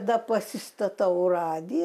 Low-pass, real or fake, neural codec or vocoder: 14.4 kHz; real; none